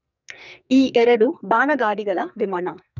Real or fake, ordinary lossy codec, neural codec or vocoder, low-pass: fake; none; codec, 44.1 kHz, 2.6 kbps, SNAC; 7.2 kHz